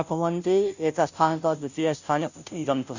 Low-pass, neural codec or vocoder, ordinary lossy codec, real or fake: 7.2 kHz; codec, 16 kHz, 0.5 kbps, FunCodec, trained on Chinese and English, 25 frames a second; none; fake